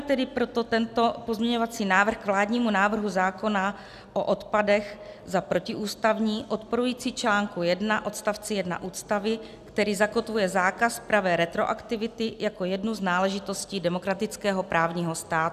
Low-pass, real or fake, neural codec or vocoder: 14.4 kHz; real; none